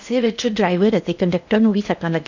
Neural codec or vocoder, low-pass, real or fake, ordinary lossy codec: codec, 16 kHz in and 24 kHz out, 0.8 kbps, FocalCodec, streaming, 65536 codes; 7.2 kHz; fake; none